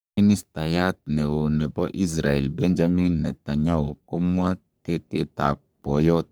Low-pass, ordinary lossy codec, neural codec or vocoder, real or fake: none; none; codec, 44.1 kHz, 3.4 kbps, Pupu-Codec; fake